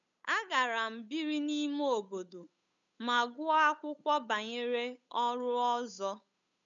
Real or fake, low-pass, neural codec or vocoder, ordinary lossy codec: fake; 7.2 kHz; codec, 16 kHz, 8 kbps, FunCodec, trained on Chinese and English, 25 frames a second; none